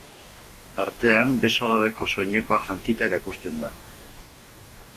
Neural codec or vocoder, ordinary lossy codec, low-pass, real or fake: codec, 44.1 kHz, 2.6 kbps, DAC; Opus, 64 kbps; 14.4 kHz; fake